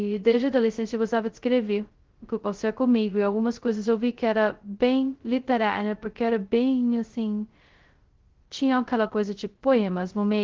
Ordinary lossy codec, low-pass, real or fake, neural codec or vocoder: Opus, 16 kbps; 7.2 kHz; fake; codec, 16 kHz, 0.2 kbps, FocalCodec